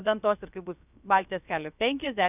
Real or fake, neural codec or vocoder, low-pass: fake; codec, 16 kHz, about 1 kbps, DyCAST, with the encoder's durations; 3.6 kHz